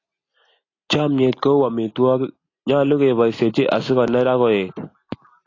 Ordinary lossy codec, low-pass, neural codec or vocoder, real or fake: AAC, 32 kbps; 7.2 kHz; none; real